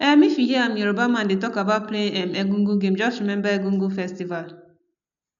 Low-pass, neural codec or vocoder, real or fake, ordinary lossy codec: 7.2 kHz; none; real; none